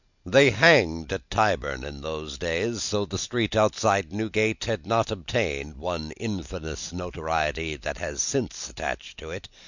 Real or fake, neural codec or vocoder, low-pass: real; none; 7.2 kHz